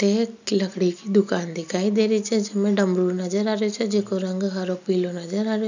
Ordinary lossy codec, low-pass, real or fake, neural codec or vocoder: none; 7.2 kHz; real; none